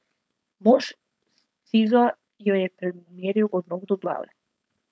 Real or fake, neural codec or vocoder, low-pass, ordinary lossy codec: fake; codec, 16 kHz, 4.8 kbps, FACodec; none; none